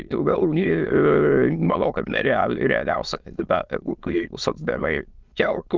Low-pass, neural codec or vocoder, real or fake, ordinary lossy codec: 7.2 kHz; autoencoder, 22.05 kHz, a latent of 192 numbers a frame, VITS, trained on many speakers; fake; Opus, 24 kbps